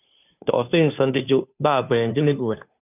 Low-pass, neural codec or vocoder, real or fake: 3.6 kHz; codec, 16 kHz, 2 kbps, FunCodec, trained on Chinese and English, 25 frames a second; fake